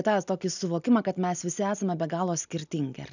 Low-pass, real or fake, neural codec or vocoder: 7.2 kHz; real; none